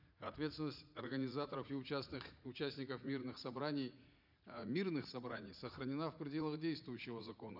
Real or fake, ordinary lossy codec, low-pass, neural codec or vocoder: fake; none; 5.4 kHz; vocoder, 44.1 kHz, 80 mel bands, Vocos